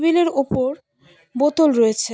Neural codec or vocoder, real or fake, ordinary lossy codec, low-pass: none; real; none; none